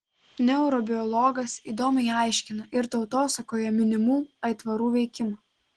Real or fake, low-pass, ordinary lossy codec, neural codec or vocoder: real; 9.9 kHz; Opus, 16 kbps; none